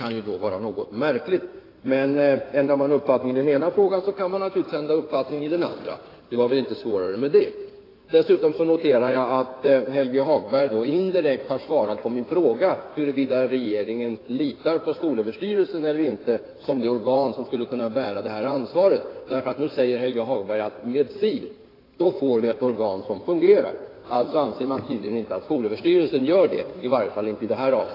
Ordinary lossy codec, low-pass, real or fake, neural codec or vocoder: AAC, 24 kbps; 5.4 kHz; fake; codec, 16 kHz in and 24 kHz out, 2.2 kbps, FireRedTTS-2 codec